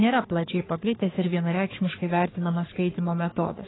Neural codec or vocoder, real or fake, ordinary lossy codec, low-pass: codec, 44.1 kHz, 3.4 kbps, Pupu-Codec; fake; AAC, 16 kbps; 7.2 kHz